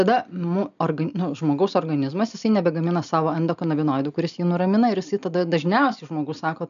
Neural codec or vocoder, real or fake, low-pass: none; real; 7.2 kHz